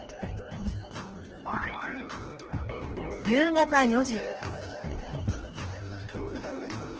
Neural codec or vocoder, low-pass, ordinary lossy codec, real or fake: codec, 16 kHz, 1 kbps, FreqCodec, larger model; 7.2 kHz; Opus, 16 kbps; fake